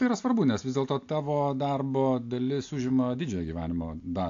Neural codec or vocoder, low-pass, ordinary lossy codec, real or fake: none; 7.2 kHz; AAC, 48 kbps; real